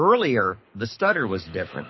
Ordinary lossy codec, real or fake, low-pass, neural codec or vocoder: MP3, 24 kbps; fake; 7.2 kHz; codec, 24 kHz, 6 kbps, HILCodec